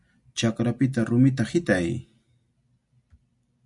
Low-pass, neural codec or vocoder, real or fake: 10.8 kHz; none; real